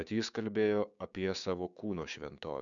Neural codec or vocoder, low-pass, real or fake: codec, 16 kHz, 6 kbps, DAC; 7.2 kHz; fake